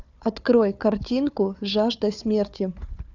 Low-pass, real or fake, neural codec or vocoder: 7.2 kHz; fake; codec, 16 kHz, 4 kbps, FunCodec, trained on Chinese and English, 50 frames a second